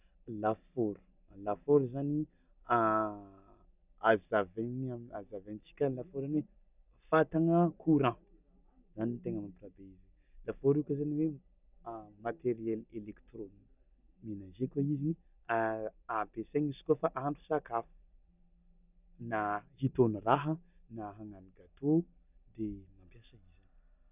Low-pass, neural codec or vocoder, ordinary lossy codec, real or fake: 3.6 kHz; none; none; real